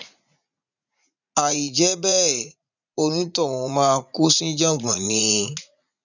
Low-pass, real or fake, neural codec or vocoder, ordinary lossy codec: 7.2 kHz; fake; vocoder, 44.1 kHz, 80 mel bands, Vocos; none